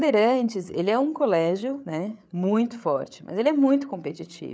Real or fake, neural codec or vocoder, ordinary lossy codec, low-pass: fake; codec, 16 kHz, 8 kbps, FreqCodec, larger model; none; none